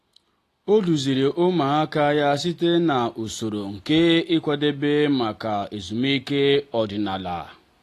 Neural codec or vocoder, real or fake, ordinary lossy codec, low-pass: none; real; AAC, 48 kbps; 14.4 kHz